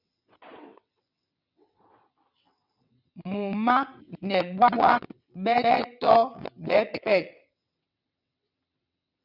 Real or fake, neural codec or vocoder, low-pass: fake; vocoder, 22.05 kHz, 80 mel bands, WaveNeXt; 5.4 kHz